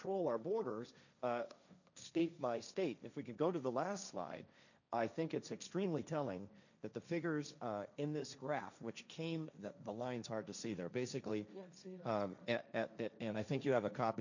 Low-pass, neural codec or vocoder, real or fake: 7.2 kHz; codec, 16 kHz, 1.1 kbps, Voila-Tokenizer; fake